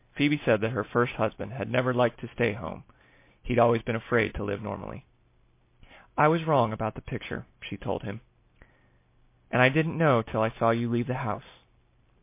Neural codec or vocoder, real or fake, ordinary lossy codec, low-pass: none; real; MP3, 24 kbps; 3.6 kHz